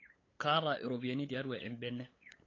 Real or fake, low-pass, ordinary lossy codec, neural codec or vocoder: fake; 7.2 kHz; AAC, 32 kbps; codec, 16 kHz, 8 kbps, FunCodec, trained on LibriTTS, 25 frames a second